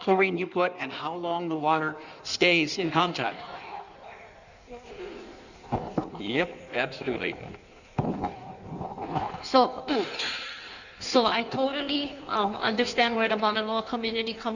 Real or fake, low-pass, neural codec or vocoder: fake; 7.2 kHz; codec, 16 kHz in and 24 kHz out, 1.1 kbps, FireRedTTS-2 codec